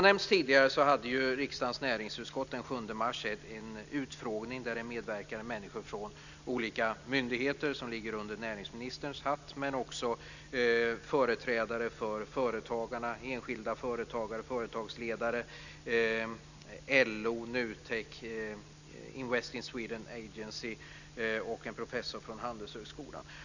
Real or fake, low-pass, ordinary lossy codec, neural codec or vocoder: real; 7.2 kHz; none; none